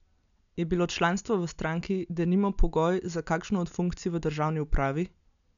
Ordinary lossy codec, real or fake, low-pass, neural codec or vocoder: none; real; 7.2 kHz; none